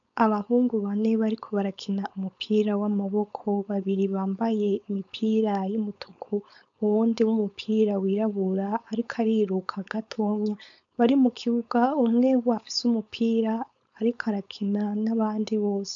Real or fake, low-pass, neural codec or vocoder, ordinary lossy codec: fake; 7.2 kHz; codec, 16 kHz, 4.8 kbps, FACodec; MP3, 64 kbps